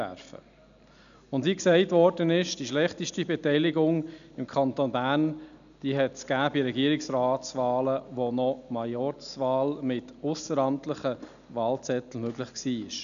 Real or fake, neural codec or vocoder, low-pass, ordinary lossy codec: real; none; 7.2 kHz; none